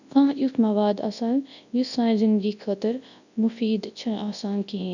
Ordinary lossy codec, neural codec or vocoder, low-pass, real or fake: none; codec, 24 kHz, 0.9 kbps, WavTokenizer, large speech release; 7.2 kHz; fake